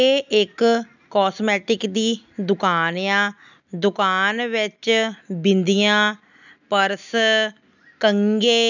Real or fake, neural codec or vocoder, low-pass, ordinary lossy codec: real; none; 7.2 kHz; none